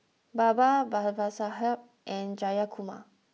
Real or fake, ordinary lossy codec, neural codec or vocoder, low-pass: real; none; none; none